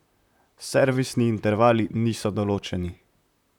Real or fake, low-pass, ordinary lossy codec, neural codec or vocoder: fake; 19.8 kHz; none; vocoder, 44.1 kHz, 128 mel bands, Pupu-Vocoder